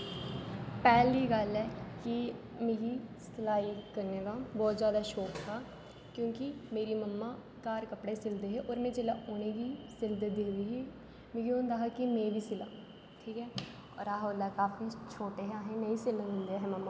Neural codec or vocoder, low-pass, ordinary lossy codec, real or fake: none; none; none; real